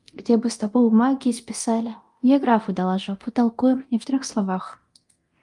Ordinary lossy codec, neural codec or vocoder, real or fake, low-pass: Opus, 32 kbps; codec, 24 kHz, 0.9 kbps, DualCodec; fake; 10.8 kHz